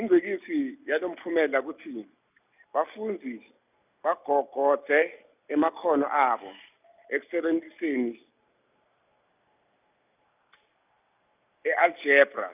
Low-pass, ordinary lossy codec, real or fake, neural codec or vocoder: 3.6 kHz; none; real; none